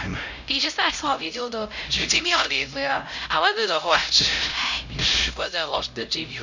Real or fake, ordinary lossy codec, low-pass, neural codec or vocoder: fake; none; 7.2 kHz; codec, 16 kHz, 0.5 kbps, X-Codec, HuBERT features, trained on LibriSpeech